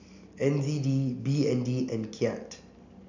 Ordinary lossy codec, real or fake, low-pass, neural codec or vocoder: none; real; 7.2 kHz; none